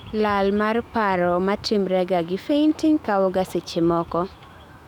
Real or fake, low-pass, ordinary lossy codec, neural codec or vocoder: fake; 19.8 kHz; none; autoencoder, 48 kHz, 128 numbers a frame, DAC-VAE, trained on Japanese speech